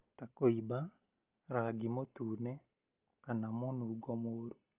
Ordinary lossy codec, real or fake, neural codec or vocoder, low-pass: Opus, 24 kbps; real; none; 3.6 kHz